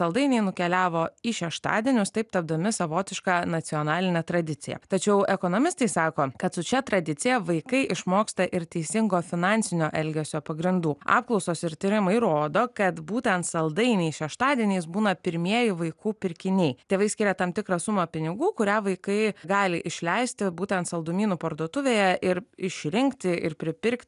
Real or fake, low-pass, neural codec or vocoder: real; 10.8 kHz; none